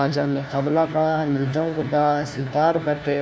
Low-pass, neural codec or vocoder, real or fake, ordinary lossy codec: none; codec, 16 kHz, 1 kbps, FunCodec, trained on LibriTTS, 50 frames a second; fake; none